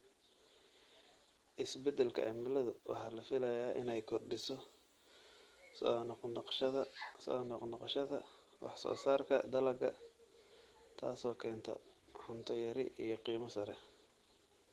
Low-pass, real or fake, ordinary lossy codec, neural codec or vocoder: 19.8 kHz; fake; Opus, 16 kbps; autoencoder, 48 kHz, 128 numbers a frame, DAC-VAE, trained on Japanese speech